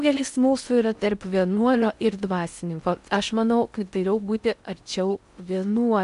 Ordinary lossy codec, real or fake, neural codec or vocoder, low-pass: AAC, 96 kbps; fake; codec, 16 kHz in and 24 kHz out, 0.6 kbps, FocalCodec, streaming, 4096 codes; 10.8 kHz